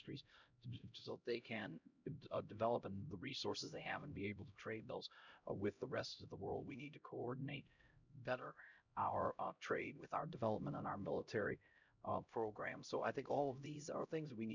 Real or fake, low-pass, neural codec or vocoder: fake; 7.2 kHz; codec, 16 kHz, 0.5 kbps, X-Codec, HuBERT features, trained on LibriSpeech